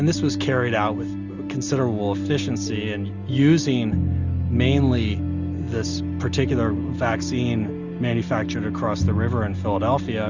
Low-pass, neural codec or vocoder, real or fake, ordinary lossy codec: 7.2 kHz; none; real; Opus, 64 kbps